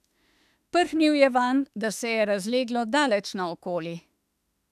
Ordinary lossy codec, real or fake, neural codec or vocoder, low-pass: none; fake; autoencoder, 48 kHz, 32 numbers a frame, DAC-VAE, trained on Japanese speech; 14.4 kHz